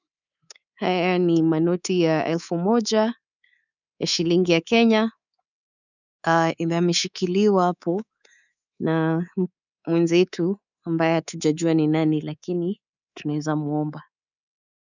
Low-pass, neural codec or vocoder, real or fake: 7.2 kHz; codec, 24 kHz, 3.1 kbps, DualCodec; fake